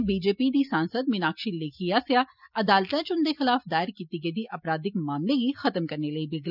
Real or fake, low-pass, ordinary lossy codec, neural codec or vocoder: real; 5.4 kHz; none; none